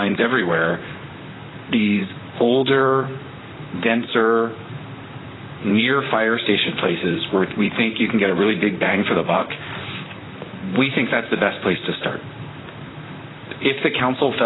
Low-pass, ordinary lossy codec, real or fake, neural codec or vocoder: 7.2 kHz; AAC, 16 kbps; fake; vocoder, 44.1 kHz, 128 mel bands, Pupu-Vocoder